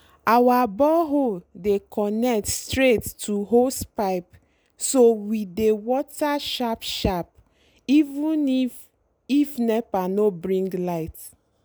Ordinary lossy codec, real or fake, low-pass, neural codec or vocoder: none; real; none; none